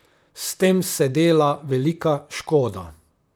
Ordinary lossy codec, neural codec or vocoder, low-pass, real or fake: none; vocoder, 44.1 kHz, 128 mel bands, Pupu-Vocoder; none; fake